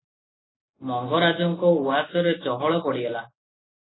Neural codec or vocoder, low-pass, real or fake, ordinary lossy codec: none; 7.2 kHz; real; AAC, 16 kbps